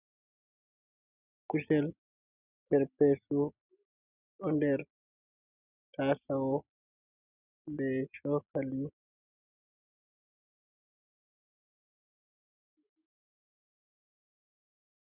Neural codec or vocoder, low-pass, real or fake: none; 3.6 kHz; real